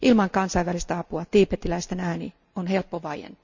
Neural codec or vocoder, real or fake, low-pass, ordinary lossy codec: none; real; 7.2 kHz; none